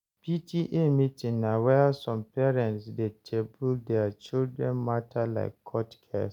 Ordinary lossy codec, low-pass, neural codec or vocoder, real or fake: none; 19.8 kHz; none; real